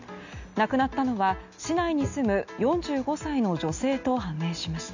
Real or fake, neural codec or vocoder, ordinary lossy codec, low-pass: real; none; none; 7.2 kHz